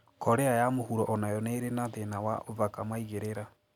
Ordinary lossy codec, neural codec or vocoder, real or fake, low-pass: none; none; real; 19.8 kHz